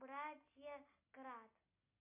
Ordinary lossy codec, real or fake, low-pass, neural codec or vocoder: MP3, 32 kbps; real; 3.6 kHz; none